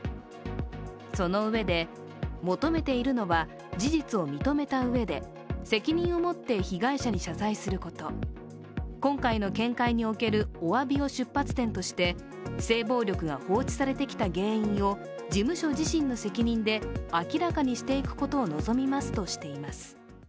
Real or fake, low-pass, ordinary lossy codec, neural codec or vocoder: real; none; none; none